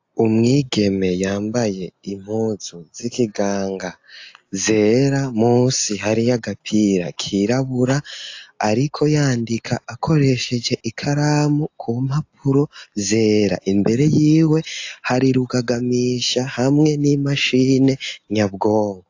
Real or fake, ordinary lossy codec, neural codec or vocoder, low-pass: real; AAC, 48 kbps; none; 7.2 kHz